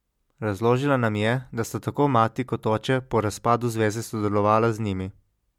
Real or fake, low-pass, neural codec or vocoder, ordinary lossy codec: real; 19.8 kHz; none; MP3, 96 kbps